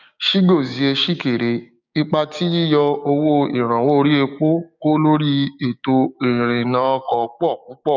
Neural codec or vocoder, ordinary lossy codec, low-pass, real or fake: codec, 16 kHz, 6 kbps, DAC; none; 7.2 kHz; fake